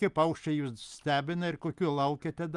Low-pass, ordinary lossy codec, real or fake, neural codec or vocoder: 10.8 kHz; Opus, 32 kbps; real; none